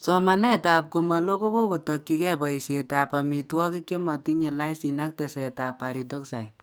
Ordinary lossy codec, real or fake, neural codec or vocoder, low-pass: none; fake; codec, 44.1 kHz, 2.6 kbps, SNAC; none